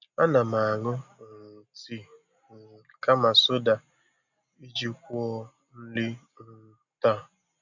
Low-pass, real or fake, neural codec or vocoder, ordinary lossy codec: 7.2 kHz; real; none; none